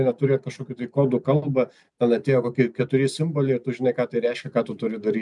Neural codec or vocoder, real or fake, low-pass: none; real; 10.8 kHz